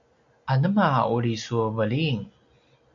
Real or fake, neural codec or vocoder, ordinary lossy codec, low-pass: real; none; AAC, 64 kbps; 7.2 kHz